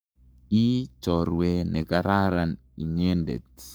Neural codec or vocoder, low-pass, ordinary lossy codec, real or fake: codec, 44.1 kHz, 7.8 kbps, Pupu-Codec; none; none; fake